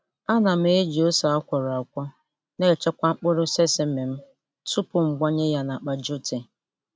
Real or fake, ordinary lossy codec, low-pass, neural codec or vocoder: real; none; none; none